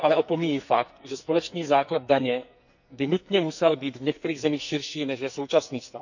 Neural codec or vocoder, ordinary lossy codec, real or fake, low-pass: codec, 44.1 kHz, 2.6 kbps, SNAC; none; fake; 7.2 kHz